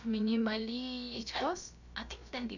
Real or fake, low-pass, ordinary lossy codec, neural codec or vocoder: fake; 7.2 kHz; none; codec, 16 kHz, about 1 kbps, DyCAST, with the encoder's durations